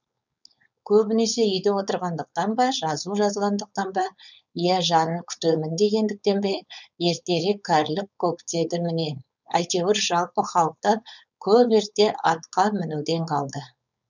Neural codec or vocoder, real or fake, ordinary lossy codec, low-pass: codec, 16 kHz, 4.8 kbps, FACodec; fake; none; 7.2 kHz